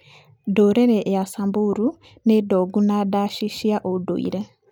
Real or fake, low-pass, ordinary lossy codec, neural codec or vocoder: real; 19.8 kHz; none; none